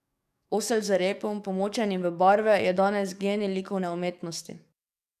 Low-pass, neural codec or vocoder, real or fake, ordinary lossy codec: 14.4 kHz; codec, 44.1 kHz, 7.8 kbps, DAC; fake; none